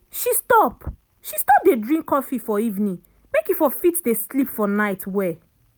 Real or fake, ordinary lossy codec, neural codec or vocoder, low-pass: real; none; none; none